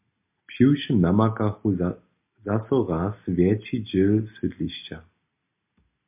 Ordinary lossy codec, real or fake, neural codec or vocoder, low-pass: MP3, 32 kbps; real; none; 3.6 kHz